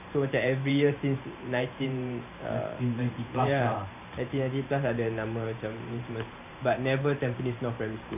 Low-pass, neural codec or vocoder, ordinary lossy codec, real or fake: 3.6 kHz; vocoder, 44.1 kHz, 128 mel bands every 512 samples, BigVGAN v2; none; fake